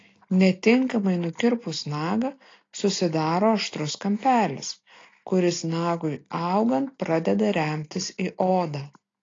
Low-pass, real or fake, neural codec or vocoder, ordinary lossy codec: 7.2 kHz; real; none; AAC, 32 kbps